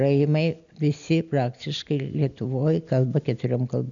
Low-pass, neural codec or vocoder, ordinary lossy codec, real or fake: 7.2 kHz; none; MP3, 96 kbps; real